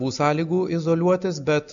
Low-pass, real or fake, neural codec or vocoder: 7.2 kHz; real; none